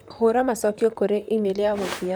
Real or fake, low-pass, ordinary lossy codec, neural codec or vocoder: fake; none; none; vocoder, 44.1 kHz, 128 mel bands, Pupu-Vocoder